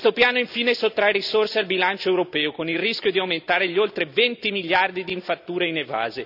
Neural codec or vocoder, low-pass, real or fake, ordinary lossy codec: none; 5.4 kHz; real; none